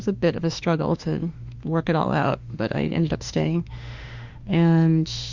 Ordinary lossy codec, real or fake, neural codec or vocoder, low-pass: Opus, 64 kbps; fake; autoencoder, 48 kHz, 32 numbers a frame, DAC-VAE, trained on Japanese speech; 7.2 kHz